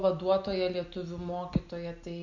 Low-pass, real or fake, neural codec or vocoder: 7.2 kHz; real; none